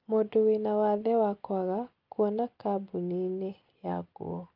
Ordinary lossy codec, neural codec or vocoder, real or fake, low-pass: Opus, 24 kbps; none; real; 5.4 kHz